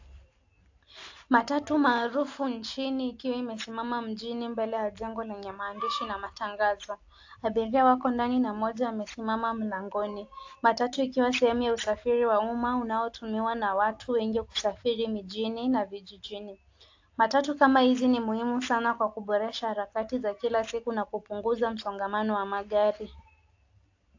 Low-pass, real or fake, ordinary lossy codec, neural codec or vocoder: 7.2 kHz; real; AAC, 48 kbps; none